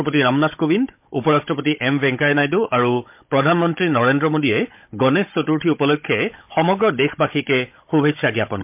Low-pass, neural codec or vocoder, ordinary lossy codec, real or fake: 3.6 kHz; codec, 16 kHz, 16 kbps, FunCodec, trained on Chinese and English, 50 frames a second; MP3, 32 kbps; fake